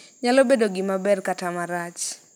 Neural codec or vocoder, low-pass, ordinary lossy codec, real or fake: none; none; none; real